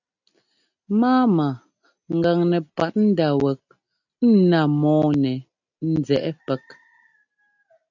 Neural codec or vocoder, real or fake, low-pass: none; real; 7.2 kHz